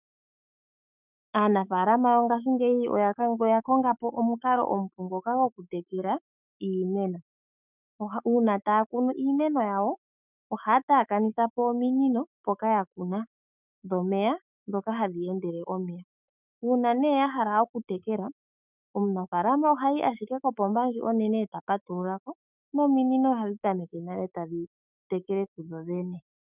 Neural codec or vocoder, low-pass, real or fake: autoencoder, 48 kHz, 128 numbers a frame, DAC-VAE, trained on Japanese speech; 3.6 kHz; fake